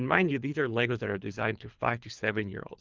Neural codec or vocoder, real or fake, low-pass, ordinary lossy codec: codec, 24 kHz, 3 kbps, HILCodec; fake; 7.2 kHz; Opus, 24 kbps